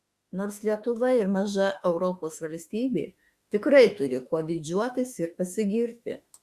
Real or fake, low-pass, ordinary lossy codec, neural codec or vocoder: fake; 14.4 kHz; Opus, 64 kbps; autoencoder, 48 kHz, 32 numbers a frame, DAC-VAE, trained on Japanese speech